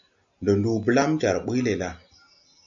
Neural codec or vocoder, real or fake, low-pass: none; real; 7.2 kHz